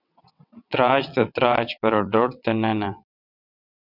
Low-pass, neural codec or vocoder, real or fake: 5.4 kHz; vocoder, 22.05 kHz, 80 mel bands, WaveNeXt; fake